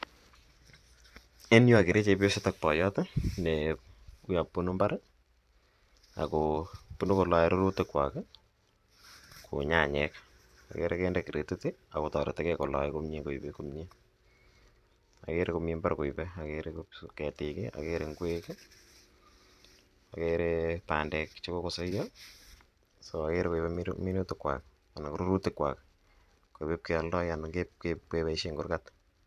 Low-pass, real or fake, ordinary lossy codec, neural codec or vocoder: 14.4 kHz; fake; none; vocoder, 44.1 kHz, 128 mel bands every 512 samples, BigVGAN v2